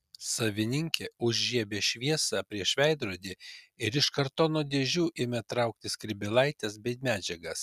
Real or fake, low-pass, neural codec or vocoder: real; 14.4 kHz; none